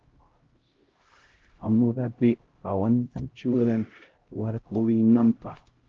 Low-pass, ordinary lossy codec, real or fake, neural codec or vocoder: 7.2 kHz; Opus, 16 kbps; fake; codec, 16 kHz, 0.5 kbps, X-Codec, HuBERT features, trained on LibriSpeech